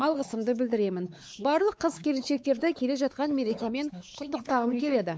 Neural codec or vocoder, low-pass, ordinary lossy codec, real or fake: codec, 16 kHz, 4 kbps, X-Codec, WavLM features, trained on Multilingual LibriSpeech; none; none; fake